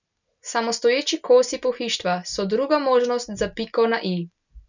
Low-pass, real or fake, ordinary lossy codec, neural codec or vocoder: 7.2 kHz; real; none; none